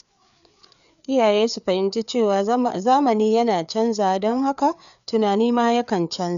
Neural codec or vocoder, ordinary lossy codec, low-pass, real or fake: codec, 16 kHz, 4 kbps, FreqCodec, larger model; none; 7.2 kHz; fake